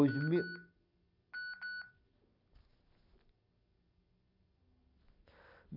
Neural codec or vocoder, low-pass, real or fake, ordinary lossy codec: none; 5.4 kHz; real; none